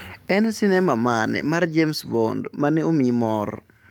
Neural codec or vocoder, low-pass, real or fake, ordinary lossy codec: codec, 44.1 kHz, 7.8 kbps, DAC; none; fake; none